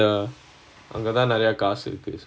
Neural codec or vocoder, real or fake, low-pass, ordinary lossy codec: none; real; none; none